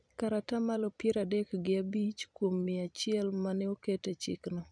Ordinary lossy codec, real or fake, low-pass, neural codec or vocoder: none; fake; 9.9 kHz; vocoder, 44.1 kHz, 128 mel bands every 256 samples, BigVGAN v2